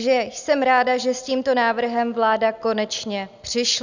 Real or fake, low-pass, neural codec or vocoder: real; 7.2 kHz; none